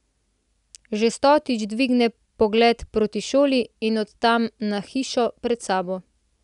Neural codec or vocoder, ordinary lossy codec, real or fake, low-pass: none; none; real; 10.8 kHz